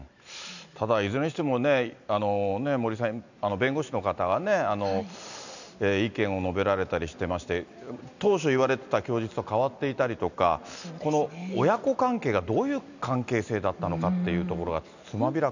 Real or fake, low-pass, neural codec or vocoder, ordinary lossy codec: real; 7.2 kHz; none; none